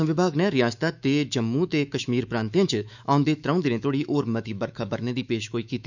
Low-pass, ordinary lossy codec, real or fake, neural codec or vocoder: 7.2 kHz; none; fake; autoencoder, 48 kHz, 128 numbers a frame, DAC-VAE, trained on Japanese speech